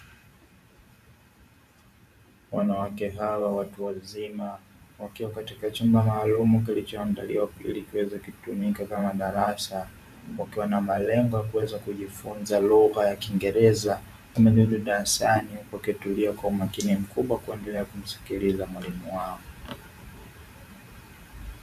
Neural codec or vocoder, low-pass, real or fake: vocoder, 44.1 kHz, 128 mel bands every 512 samples, BigVGAN v2; 14.4 kHz; fake